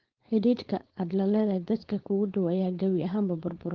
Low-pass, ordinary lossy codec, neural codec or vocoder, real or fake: 7.2 kHz; Opus, 16 kbps; codec, 16 kHz, 4.8 kbps, FACodec; fake